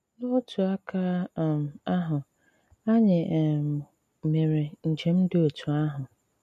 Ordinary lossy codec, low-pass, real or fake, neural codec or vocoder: MP3, 64 kbps; 14.4 kHz; real; none